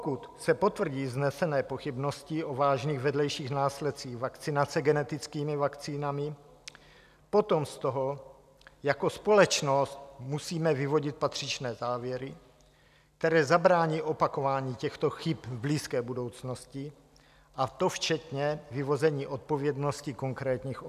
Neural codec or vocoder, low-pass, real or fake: none; 14.4 kHz; real